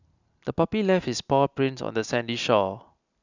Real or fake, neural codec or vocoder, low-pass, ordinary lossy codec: real; none; 7.2 kHz; none